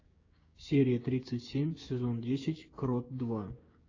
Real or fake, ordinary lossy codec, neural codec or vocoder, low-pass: fake; AAC, 32 kbps; codec, 16 kHz, 6 kbps, DAC; 7.2 kHz